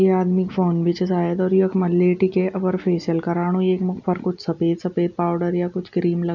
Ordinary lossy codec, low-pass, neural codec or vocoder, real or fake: none; 7.2 kHz; none; real